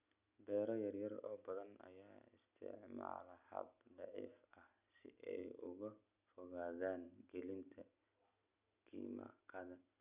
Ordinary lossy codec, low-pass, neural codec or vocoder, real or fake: none; 3.6 kHz; none; real